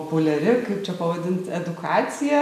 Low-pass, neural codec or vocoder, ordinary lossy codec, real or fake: 14.4 kHz; none; MP3, 96 kbps; real